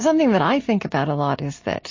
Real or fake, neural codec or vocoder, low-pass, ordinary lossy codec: real; none; 7.2 kHz; MP3, 32 kbps